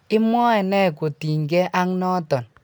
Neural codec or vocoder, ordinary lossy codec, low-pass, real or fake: none; none; none; real